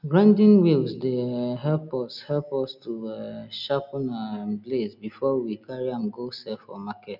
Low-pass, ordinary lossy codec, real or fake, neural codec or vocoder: 5.4 kHz; none; real; none